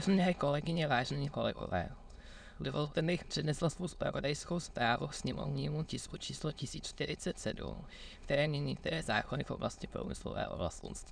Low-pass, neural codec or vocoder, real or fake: 9.9 kHz; autoencoder, 22.05 kHz, a latent of 192 numbers a frame, VITS, trained on many speakers; fake